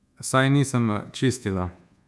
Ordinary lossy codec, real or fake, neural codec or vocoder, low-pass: none; fake; codec, 24 kHz, 1.2 kbps, DualCodec; none